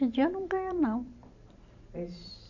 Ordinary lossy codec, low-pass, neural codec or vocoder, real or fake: none; 7.2 kHz; vocoder, 44.1 kHz, 128 mel bands every 512 samples, BigVGAN v2; fake